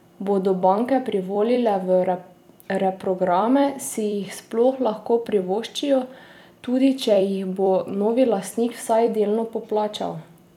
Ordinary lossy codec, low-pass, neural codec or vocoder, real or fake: none; 19.8 kHz; vocoder, 44.1 kHz, 128 mel bands every 256 samples, BigVGAN v2; fake